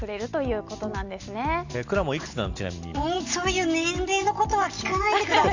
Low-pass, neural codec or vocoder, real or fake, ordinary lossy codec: 7.2 kHz; none; real; Opus, 64 kbps